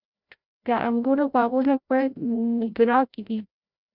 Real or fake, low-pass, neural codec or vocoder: fake; 5.4 kHz; codec, 16 kHz, 0.5 kbps, FreqCodec, larger model